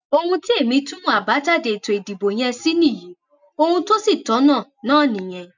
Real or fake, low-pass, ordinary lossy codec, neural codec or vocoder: real; 7.2 kHz; none; none